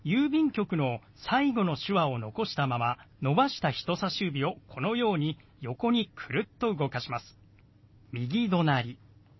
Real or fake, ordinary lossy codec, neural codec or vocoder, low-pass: real; MP3, 24 kbps; none; 7.2 kHz